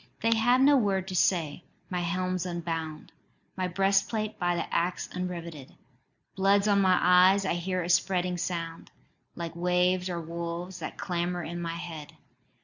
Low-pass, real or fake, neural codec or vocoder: 7.2 kHz; real; none